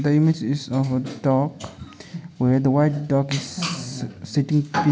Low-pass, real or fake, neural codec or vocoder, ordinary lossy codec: none; real; none; none